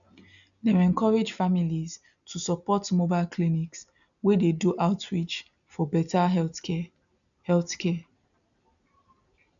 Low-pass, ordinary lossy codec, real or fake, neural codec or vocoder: 7.2 kHz; none; real; none